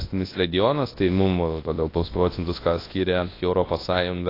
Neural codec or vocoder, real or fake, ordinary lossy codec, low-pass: codec, 24 kHz, 0.9 kbps, WavTokenizer, large speech release; fake; AAC, 24 kbps; 5.4 kHz